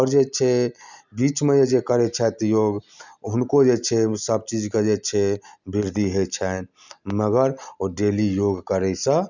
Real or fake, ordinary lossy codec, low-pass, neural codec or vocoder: real; none; 7.2 kHz; none